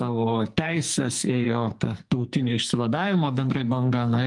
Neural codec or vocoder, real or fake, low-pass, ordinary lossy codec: codec, 44.1 kHz, 2.6 kbps, SNAC; fake; 10.8 kHz; Opus, 32 kbps